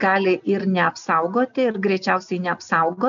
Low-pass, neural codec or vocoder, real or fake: 7.2 kHz; none; real